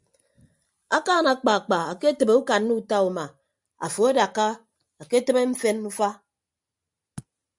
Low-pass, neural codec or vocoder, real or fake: 10.8 kHz; none; real